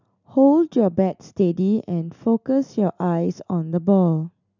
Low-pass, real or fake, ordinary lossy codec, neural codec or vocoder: 7.2 kHz; real; none; none